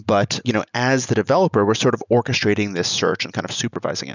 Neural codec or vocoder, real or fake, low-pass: none; real; 7.2 kHz